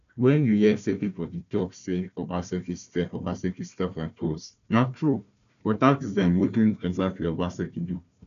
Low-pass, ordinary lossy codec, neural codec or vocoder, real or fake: 7.2 kHz; none; codec, 16 kHz, 1 kbps, FunCodec, trained on Chinese and English, 50 frames a second; fake